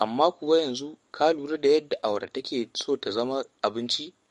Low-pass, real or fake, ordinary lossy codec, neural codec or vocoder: 14.4 kHz; fake; MP3, 48 kbps; codec, 44.1 kHz, 7.8 kbps, DAC